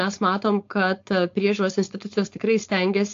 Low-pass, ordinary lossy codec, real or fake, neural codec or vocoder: 7.2 kHz; AAC, 48 kbps; fake; codec, 16 kHz, 4.8 kbps, FACodec